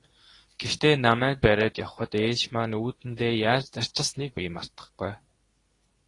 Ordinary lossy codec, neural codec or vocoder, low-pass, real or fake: AAC, 32 kbps; codec, 24 kHz, 0.9 kbps, WavTokenizer, medium speech release version 2; 10.8 kHz; fake